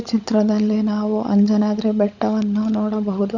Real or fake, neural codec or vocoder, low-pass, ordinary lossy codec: fake; codec, 16 kHz, 16 kbps, FunCodec, trained on LibriTTS, 50 frames a second; 7.2 kHz; none